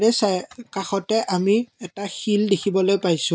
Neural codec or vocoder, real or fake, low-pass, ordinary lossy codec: none; real; none; none